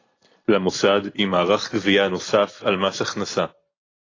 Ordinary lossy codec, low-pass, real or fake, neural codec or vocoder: AAC, 32 kbps; 7.2 kHz; real; none